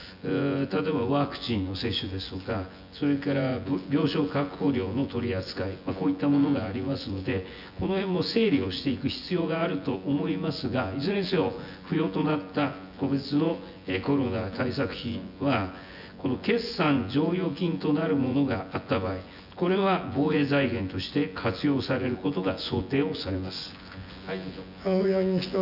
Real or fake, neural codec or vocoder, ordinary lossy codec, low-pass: fake; vocoder, 24 kHz, 100 mel bands, Vocos; none; 5.4 kHz